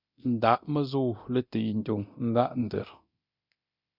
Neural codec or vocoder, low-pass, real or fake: codec, 24 kHz, 0.9 kbps, DualCodec; 5.4 kHz; fake